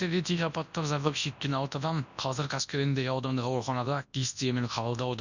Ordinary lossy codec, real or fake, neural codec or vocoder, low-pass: none; fake; codec, 24 kHz, 0.9 kbps, WavTokenizer, large speech release; 7.2 kHz